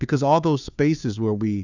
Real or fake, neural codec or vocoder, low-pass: fake; codec, 16 kHz, 2 kbps, FunCodec, trained on Chinese and English, 25 frames a second; 7.2 kHz